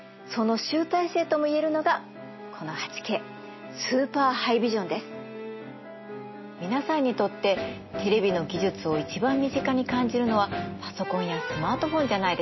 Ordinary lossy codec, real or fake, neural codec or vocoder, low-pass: MP3, 24 kbps; real; none; 7.2 kHz